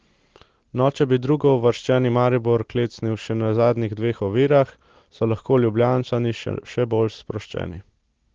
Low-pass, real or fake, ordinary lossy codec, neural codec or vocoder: 7.2 kHz; real; Opus, 16 kbps; none